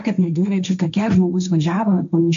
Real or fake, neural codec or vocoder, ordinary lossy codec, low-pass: fake; codec, 16 kHz, 1.1 kbps, Voila-Tokenizer; MP3, 64 kbps; 7.2 kHz